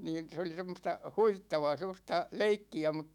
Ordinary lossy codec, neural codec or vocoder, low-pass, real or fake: none; autoencoder, 48 kHz, 128 numbers a frame, DAC-VAE, trained on Japanese speech; 19.8 kHz; fake